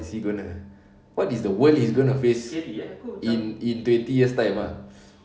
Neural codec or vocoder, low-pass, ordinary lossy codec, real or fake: none; none; none; real